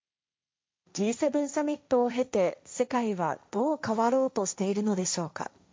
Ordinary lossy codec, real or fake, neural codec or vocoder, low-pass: none; fake; codec, 16 kHz, 1.1 kbps, Voila-Tokenizer; none